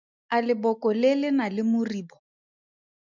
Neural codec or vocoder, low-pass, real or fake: none; 7.2 kHz; real